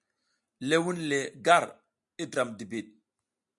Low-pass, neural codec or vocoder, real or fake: 10.8 kHz; none; real